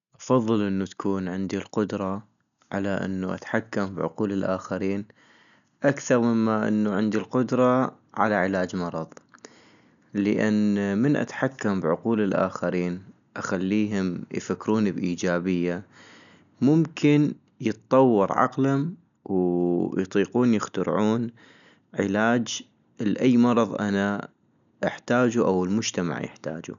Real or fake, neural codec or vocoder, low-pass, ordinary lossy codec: real; none; 7.2 kHz; none